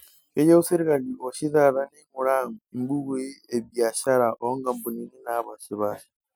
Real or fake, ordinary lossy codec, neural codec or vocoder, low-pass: real; none; none; none